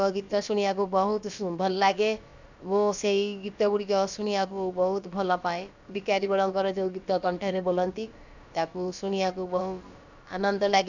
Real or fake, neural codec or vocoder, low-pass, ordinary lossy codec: fake; codec, 16 kHz, about 1 kbps, DyCAST, with the encoder's durations; 7.2 kHz; none